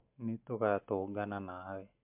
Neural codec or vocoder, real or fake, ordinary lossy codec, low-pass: none; real; MP3, 32 kbps; 3.6 kHz